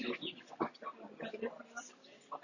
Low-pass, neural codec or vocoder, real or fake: 7.2 kHz; none; real